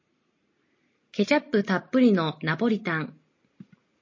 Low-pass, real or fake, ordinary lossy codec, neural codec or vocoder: 7.2 kHz; real; MP3, 32 kbps; none